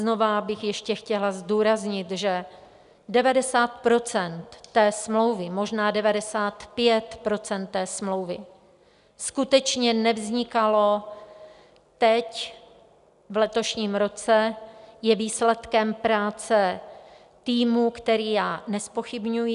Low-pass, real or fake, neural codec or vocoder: 10.8 kHz; real; none